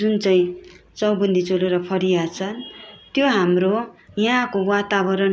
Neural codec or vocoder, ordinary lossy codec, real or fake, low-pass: none; none; real; none